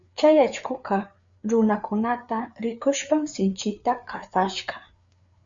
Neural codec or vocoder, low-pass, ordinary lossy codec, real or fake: codec, 16 kHz, 4 kbps, FreqCodec, larger model; 7.2 kHz; Opus, 64 kbps; fake